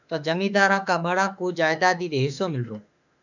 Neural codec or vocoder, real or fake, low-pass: autoencoder, 48 kHz, 32 numbers a frame, DAC-VAE, trained on Japanese speech; fake; 7.2 kHz